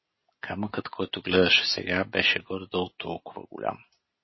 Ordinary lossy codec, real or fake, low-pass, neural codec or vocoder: MP3, 24 kbps; real; 7.2 kHz; none